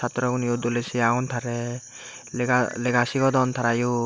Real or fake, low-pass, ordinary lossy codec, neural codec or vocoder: real; none; none; none